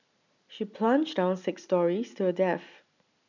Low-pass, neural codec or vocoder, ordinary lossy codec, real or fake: 7.2 kHz; none; none; real